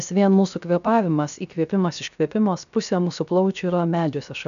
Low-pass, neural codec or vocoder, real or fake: 7.2 kHz; codec, 16 kHz, 0.7 kbps, FocalCodec; fake